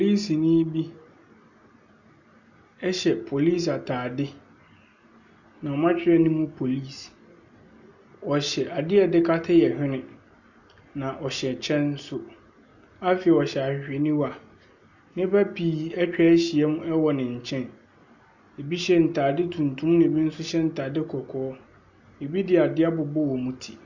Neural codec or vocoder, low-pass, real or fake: none; 7.2 kHz; real